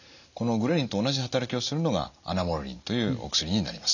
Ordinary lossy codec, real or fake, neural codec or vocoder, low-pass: none; real; none; 7.2 kHz